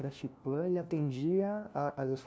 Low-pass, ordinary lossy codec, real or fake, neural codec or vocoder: none; none; fake; codec, 16 kHz, 1 kbps, FunCodec, trained on LibriTTS, 50 frames a second